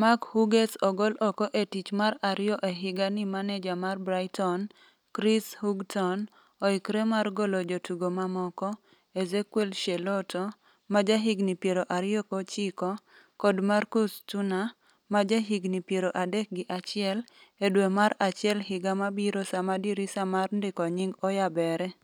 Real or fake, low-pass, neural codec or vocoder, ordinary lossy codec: real; 19.8 kHz; none; none